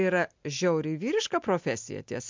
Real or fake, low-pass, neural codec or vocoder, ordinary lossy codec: real; 7.2 kHz; none; MP3, 64 kbps